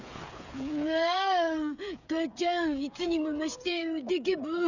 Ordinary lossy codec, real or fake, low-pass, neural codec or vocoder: none; fake; 7.2 kHz; codec, 16 kHz, 8 kbps, FreqCodec, smaller model